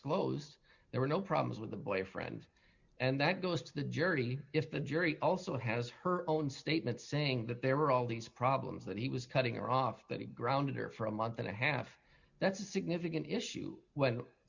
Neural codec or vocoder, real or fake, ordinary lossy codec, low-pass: none; real; Opus, 64 kbps; 7.2 kHz